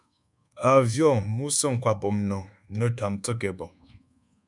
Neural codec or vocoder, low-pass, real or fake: codec, 24 kHz, 1.2 kbps, DualCodec; 10.8 kHz; fake